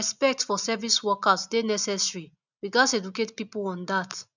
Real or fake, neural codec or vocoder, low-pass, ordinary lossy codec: real; none; 7.2 kHz; none